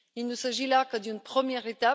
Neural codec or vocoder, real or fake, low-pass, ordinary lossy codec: none; real; none; none